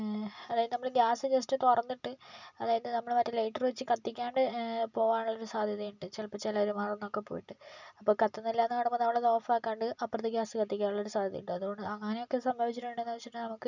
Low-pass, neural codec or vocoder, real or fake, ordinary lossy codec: 7.2 kHz; none; real; none